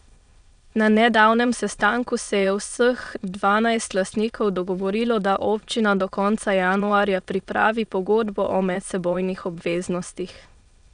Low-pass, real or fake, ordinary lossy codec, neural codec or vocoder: 9.9 kHz; fake; none; autoencoder, 22.05 kHz, a latent of 192 numbers a frame, VITS, trained on many speakers